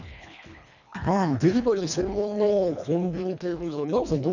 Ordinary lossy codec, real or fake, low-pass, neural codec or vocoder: none; fake; 7.2 kHz; codec, 24 kHz, 1.5 kbps, HILCodec